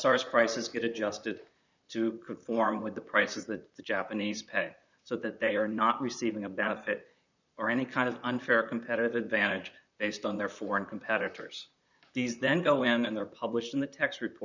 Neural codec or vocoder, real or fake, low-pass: vocoder, 44.1 kHz, 128 mel bands, Pupu-Vocoder; fake; 7.2 kHz